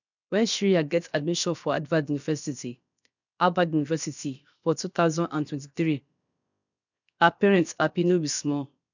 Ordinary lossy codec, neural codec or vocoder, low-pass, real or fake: none; codec, 16 kHz, about 1 kbps, DyCAST, with the encoder's durations; 7.2 kHz; fake